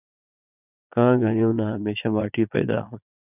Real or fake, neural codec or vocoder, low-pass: fake; vocoder, 22.05 kHz, 80 mel bands, Vocos; 3.6 kHz